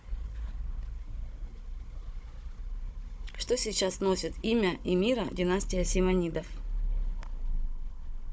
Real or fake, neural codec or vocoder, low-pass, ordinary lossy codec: fake; codec, 16 kHz, 16 kbps, FunCodec, trained on Chinese and English, 50 frames a second; none; none